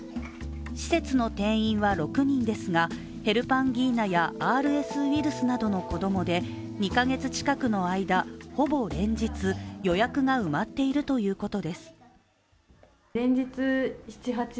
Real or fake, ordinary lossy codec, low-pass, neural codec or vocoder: real; none; none; none